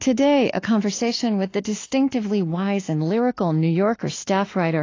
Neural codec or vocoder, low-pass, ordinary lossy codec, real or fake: autoencoder, 48 kHz, 32 numbers a frame, DAC-VAE, trained on Japanese speech; 7.2 kHz; AAC, 32 kbps; fake